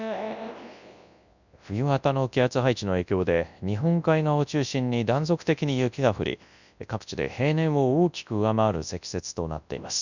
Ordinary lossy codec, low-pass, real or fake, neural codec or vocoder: none; 7.2 kHz; fake; codec, 24 kHz, 0.9 kbps, WavTokenizer, large speech release